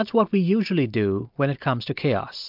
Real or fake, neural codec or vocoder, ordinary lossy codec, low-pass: real; none; MP3, 48 kbps; 5.4 kHz